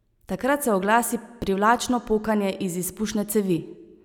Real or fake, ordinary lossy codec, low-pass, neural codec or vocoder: real; none; 19.8 kHz; none